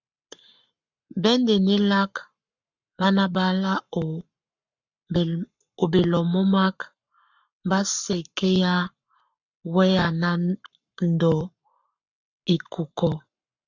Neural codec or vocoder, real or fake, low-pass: codec, 44.1 kHz, 7.8 kbps, Pupu-Codec; fake; 7.2 kHz